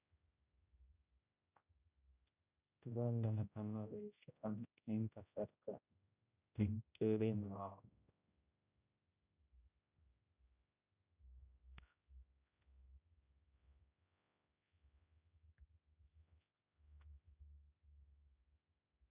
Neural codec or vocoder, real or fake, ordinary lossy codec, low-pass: codec, 16 kHz, 0.5 kbps, X-Codec, HuBERT features, trained on general audio; fake; none; 3.6 kHz